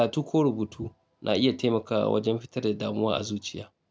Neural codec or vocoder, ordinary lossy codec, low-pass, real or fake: none; none; none; real